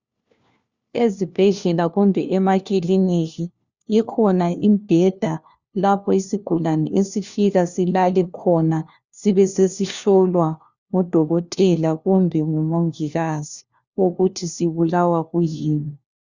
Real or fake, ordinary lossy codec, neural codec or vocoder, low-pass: fake; Opus, 64 kbps; codec, 16 kHz, 1 kbps, FunCodec, trained on LibriTTS, 50 frames a second; 7.2 kHz